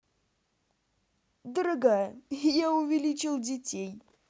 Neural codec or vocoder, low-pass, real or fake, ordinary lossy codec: none; none; real; none